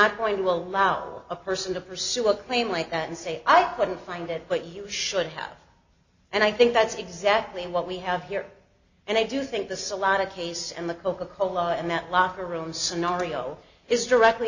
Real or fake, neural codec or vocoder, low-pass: real; none; 7.2 kHz